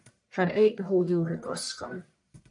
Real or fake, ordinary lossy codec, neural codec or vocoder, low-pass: fake; MP3, 64 kbps; codec, 44.1 kHz, 1.7 kbps, Pupu-Codec; 10.8 kHz